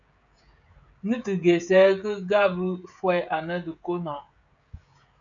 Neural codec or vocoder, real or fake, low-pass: codec, 16 kHz, 16 kbps, FreqCodec, smaller model; fake; 7.2 kHz